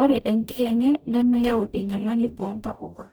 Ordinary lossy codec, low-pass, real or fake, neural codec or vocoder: none; none; fake; codec, 44.1 kHz, 0.9 kbps, DAC